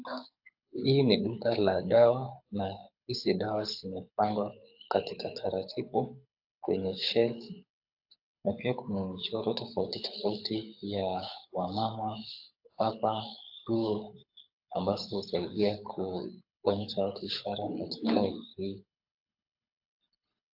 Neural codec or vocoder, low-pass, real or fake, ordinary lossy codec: codec, 24 kHz, 6 kbps, HILCodec; 5.4 kHz; fake; AAC, 48 kbps